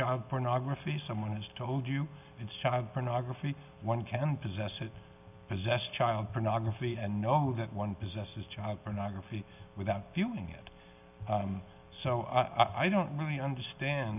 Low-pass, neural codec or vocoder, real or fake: 3.6 kHz; none; real